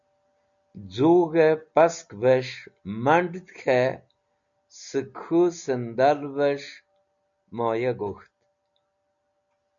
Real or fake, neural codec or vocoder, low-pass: real; none; 7.2 kHz